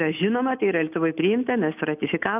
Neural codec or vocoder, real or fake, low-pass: none; real; 3.6 kHz